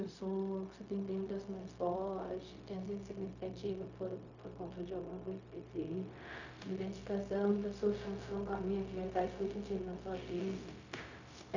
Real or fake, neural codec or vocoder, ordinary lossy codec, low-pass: fake; codec, 16 kHz, 0.4 kbps, LongCat-Audio-Codec; none; 7.2 kHz